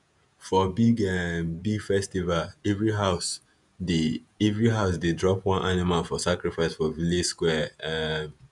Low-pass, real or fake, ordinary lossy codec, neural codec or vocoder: 10.8 kHz; fake; none; vocoder, 48 kHz, 128 mel bands, Vocos